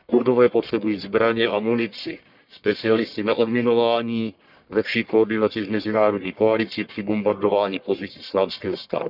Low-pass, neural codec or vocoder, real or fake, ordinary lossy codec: 5.4 kHz; codec, 44.1 kHz, 1.7 kbps, Pupu-Codec; fake; none